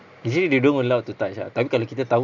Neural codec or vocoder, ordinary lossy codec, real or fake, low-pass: none; none; real; 7.2 kHz